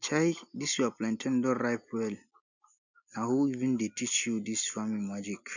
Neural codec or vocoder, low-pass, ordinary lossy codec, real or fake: none; 7.2 kHz; none; real